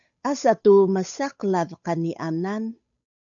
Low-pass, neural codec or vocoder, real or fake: 7.2 kHz; codec, 16 kHz, 8 kbps, FunCodec, trained on Chinese and English, 25 frames a second; fake